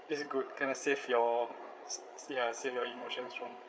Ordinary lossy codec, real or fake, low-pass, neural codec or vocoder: none; fake; none; codec, 16 kHz, 8 kbps, FreqCodec, larger model